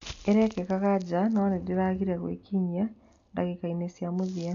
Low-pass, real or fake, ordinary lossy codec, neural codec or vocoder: 7.2 kHz; real; AAC, 64 kbps; none